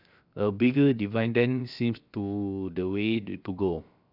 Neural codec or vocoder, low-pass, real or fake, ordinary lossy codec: codec, 16 kHz, 0.7 kbps, FocalCodec; 5.4 kHz; fake; none